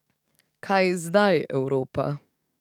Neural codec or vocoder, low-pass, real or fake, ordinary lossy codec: codec, 44.1 kHz, 7.8 kbps, DAC; 19.8 kHz; fake; none